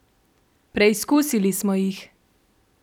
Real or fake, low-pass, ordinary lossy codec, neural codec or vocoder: fake; 19.8 kHz; none; vocoder, 44.1 kHz, 128 mel bands every 256 samples, BigVGAN v2